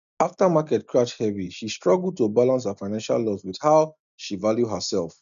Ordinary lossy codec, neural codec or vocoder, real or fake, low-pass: none; none; real; 7.2 kHz